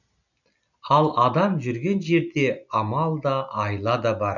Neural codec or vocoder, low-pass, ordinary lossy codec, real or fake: none; 7.2 kHz; none; real